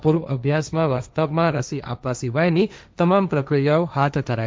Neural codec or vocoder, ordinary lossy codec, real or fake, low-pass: codec, 16 kHz, 1.1 kbps, Voila-Tokenizer; none; fake; none